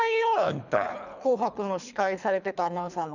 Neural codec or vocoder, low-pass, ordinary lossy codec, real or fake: codec, 24 kHz, 3 kbps, HILCodec; 7.2 kHz; none; fake